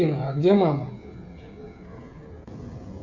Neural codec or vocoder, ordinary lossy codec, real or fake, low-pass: codec, 16 kHz, 16 kbps, FreqCodec, smaller model; MP3, 64 kbps; fake; 7.2 kHz